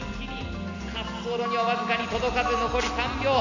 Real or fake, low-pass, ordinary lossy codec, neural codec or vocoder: real; 7.2 kHz; none; none